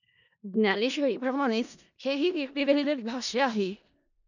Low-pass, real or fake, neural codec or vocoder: 7.2 kHz; fake; codec, 16 kHz in and 24 kHz out, 0.4 kbps, LongCat-Audio-Codec, four codebook decoder